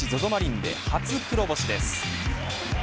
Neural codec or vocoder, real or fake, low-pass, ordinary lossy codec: none; real; none; none